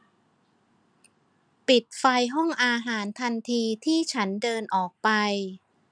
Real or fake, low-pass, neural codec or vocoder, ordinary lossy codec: real; 9.9 kHz; none; none